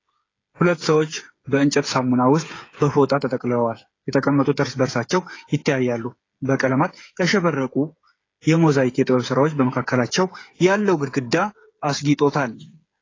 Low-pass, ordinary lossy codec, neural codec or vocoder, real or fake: 7.2 kHz; AAC, 32 kbps; codec, 16 kHz, 8 kbps, FreqCodec, smaller model; fake